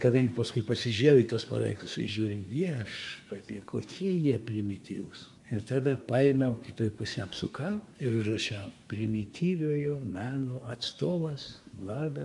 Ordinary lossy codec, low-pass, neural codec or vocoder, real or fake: MP3, 96 kbps; 10.8 kHz; codec, 24 kHz, 1 kbps, SNAC; fake